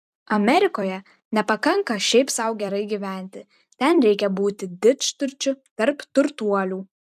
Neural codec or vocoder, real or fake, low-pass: vocoder, 44.1 kHz, 128 mel bands every 256 samples, BigVGAN v2; fake; 14.4 kHz